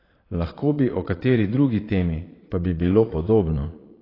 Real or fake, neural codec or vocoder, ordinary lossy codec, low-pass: fake; vocoder, 22.05 kHz, 80 mel bands, Vocos; AAC, 24 kbps; 5.4 kHz